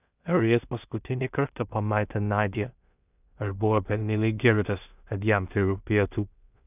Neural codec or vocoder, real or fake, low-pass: codec, 16 kHz in and 24 kHz out, 0.4 kbps, LongCat-Audio-Codec, two codebook decoder; fake; 3.6 kHz